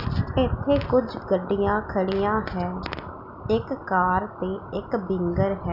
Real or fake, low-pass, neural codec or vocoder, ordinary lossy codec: real; 5.4 kHz; none; none